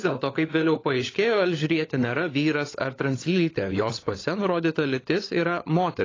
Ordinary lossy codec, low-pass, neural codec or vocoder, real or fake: AAC, 32 kbps; 7.2 kHz; codec, 16 kHz, 16 kbps, FunCodec, trained on LibriTTS, 50 frames a second; fake